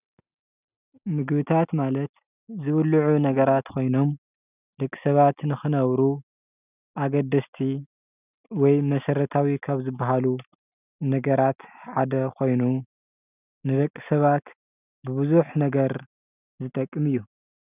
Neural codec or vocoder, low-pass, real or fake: none; 3.6 kHz; real